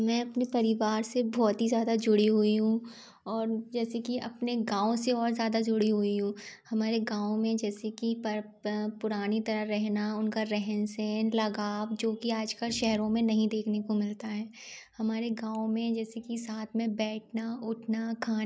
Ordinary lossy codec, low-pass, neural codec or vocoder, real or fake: none; none; none; real